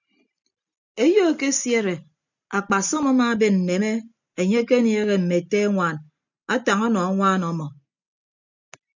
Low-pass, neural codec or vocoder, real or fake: 7.2 kHz; none; real